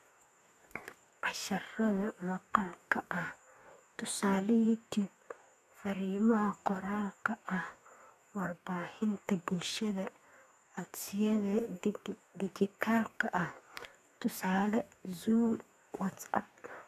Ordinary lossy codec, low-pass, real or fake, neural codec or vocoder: none; 14.4 kHz; fake; codec, 44.1 kHz, 2.6 kbps, DAC